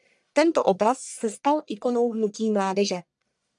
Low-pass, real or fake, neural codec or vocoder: 10.8 kHz; fake; codec, 44.1 kHz, 1.7 kbps, Pupu-Codec